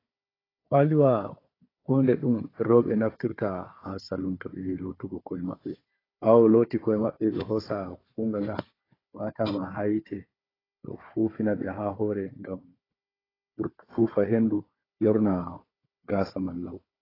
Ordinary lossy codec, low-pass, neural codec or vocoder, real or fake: AAC, 24 kbps; 5.4 kHz; codec, 16 kHz, 4 kbps, FunCodec, trained on Chinese and English, 50 frames a second; fake